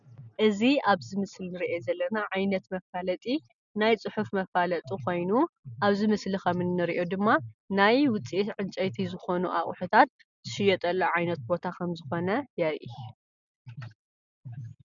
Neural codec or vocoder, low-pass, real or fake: none; 7.2 kHz; real